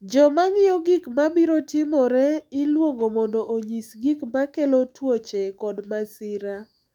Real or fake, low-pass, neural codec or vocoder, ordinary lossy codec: fake; 19.8 kHz; autoencoder, 48 kHz, 128 numbers a frame, DAC-VAE, trained on Japanese speech; none